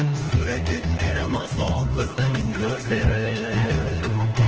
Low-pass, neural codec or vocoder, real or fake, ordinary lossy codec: 7.2 kHz; codec, 16 kHz, 1 kbps, FunCodec, trained on LibriTTS, 50 frames a second; fake; Opus, 16 kbps